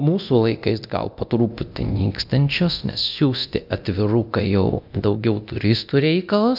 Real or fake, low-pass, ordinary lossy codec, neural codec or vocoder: fake; 5.4 kHz; AAC, 48 kbps; codec, 24 kHz, 0.9 kbps, DualCodec